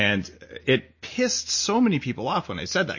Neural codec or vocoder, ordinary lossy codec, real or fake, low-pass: none; MP3, 32 kbps; real; 7.2 kHz